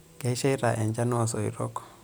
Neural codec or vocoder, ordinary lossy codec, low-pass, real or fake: none; none; none; real